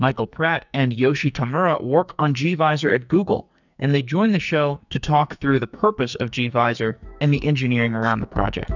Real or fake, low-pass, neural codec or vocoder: fake; 7.2 kHz; codec, 44.1 kHz, 2.6 kbps, SNAC